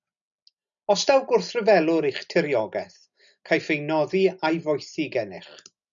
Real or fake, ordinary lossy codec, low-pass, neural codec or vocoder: real; MP3, 96 kbps; 7.2 kHz; none